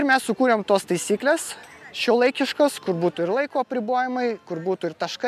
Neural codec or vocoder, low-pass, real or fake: none; 14.4 kHz; real